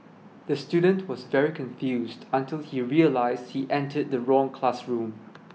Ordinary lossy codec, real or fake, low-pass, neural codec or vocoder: none; real; none; none